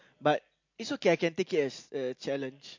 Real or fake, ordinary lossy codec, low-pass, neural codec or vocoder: real; MP3, 48 kbps; 7.2 kHz; none